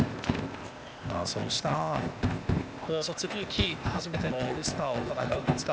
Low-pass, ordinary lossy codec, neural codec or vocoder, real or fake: none; none; codec, 16 kHz, 0.8 kbps, ZipCodec; fake